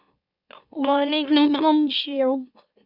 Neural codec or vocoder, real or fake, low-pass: autoencoder, 44.1 kHz, a latent of 192 numbers a frame, MeloTTS; fake; 5.4 kHz